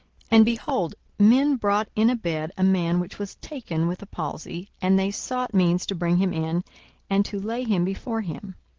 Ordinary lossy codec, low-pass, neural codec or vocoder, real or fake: Opus, 16 kbps; 7.2 kHz; none; real